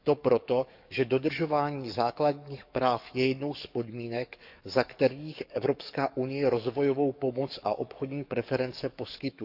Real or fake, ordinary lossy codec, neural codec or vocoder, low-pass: fake; none; codec, 44.1 kHz, 7.8 kbps, DAC; 5.4 kHz